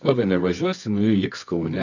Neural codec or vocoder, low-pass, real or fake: codec, 24 kHz, 0.9 kbps, WavTokenizer, medium music audio release; 7.2 kHz; fake